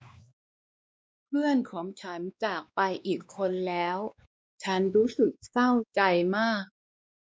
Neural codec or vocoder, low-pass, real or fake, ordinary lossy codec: codec, 16 kHz, 2 kbps, X-Codec, WavLM features, trained on Multilingual LibriSpeech; none; fake; none